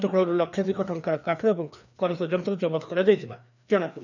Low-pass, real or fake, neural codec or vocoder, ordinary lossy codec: 7.2 kHz; fake; codec, 16 kHz, 2 kbps, FreqCodec, larger model; none